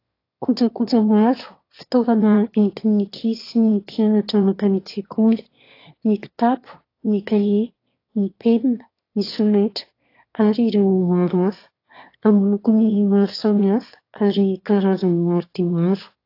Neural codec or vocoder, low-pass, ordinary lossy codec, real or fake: autoencoder, 22.05 kHz, a latent of 192 numbers a frame, VITS, trained on one speaker; 5.4 kHz; AAC, 32 kbps; fake